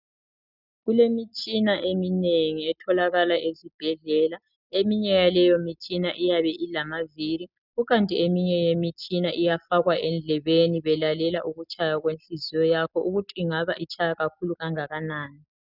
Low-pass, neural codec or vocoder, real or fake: 5.4 kHz; none; real